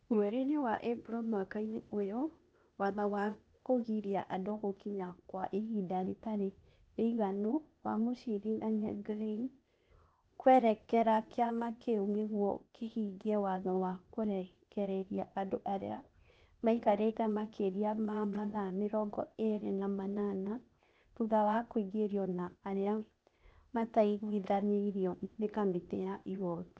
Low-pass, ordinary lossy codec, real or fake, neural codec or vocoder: none; none; fake; codec, 16 kHz, 0.8 kbps, ZipCodec